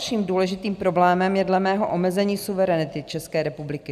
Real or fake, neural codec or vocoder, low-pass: real; none; 14.4 kHz